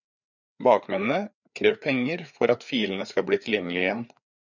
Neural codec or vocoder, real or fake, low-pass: codec, 16 kHz, 4 kbps, FreqCodec, larger model; fake; 7.2 kHz